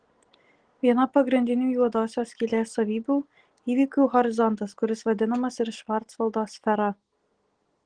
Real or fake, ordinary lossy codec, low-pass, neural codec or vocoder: real; Opus, 16 kbps; 9.9 kHz; none